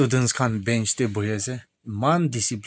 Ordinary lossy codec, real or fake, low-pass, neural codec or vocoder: none; real; none; none